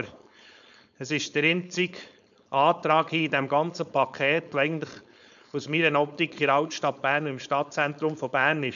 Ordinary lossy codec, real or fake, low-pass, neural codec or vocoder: none; fake; 7.2 kHz; codec, 16 kHz, 4.8 kbps, FACodec